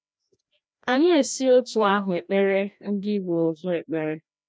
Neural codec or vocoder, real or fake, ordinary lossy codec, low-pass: codec, 16 kHz, 1 kbps, FreqCodec, larger model; fake; none; none